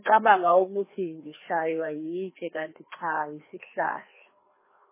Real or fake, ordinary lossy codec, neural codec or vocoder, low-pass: fake; MP3, 16 kbps; codec, 16 kHz, 2 kbps, FreqCodec, larger model; 3.6 kHz